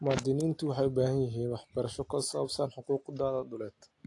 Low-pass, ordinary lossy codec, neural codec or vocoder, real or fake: 10.8 kHz; AAC, 48 kbps; none; real